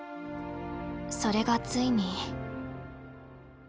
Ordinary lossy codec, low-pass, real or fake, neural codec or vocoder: none; none; real; none